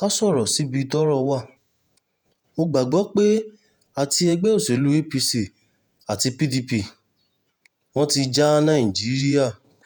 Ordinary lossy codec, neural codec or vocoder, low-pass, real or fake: none; vocoder, 48 kHz, 128 mel bands, Vocos; none; fake